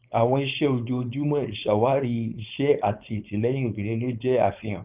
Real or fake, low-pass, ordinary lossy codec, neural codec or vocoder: fake; 3.6 kHz; Opus, 24 kbps; codec, 16 kHz, 4.8 kbps, FACodec